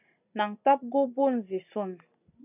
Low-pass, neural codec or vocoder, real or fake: 3.6 kHz; none; real